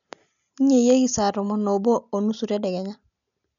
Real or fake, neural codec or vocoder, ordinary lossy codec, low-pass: real; none; none; 7.2 kHz